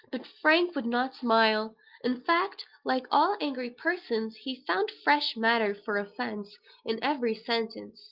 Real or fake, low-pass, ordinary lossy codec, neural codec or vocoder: real; 5.4 kHz; Opus, 32 kbps; none